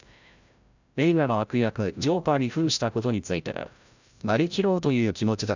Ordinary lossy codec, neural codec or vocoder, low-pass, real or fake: none; codec, 16 kHz, 0.5 kbps, FreqCodec, larger model; 7.2 kHz; fake